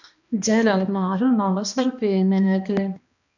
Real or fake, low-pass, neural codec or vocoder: fake; 7.2 kHz; codec, 16 kHz, 1 kbps, X-Codec, HuBERT features, trained on balanced general audio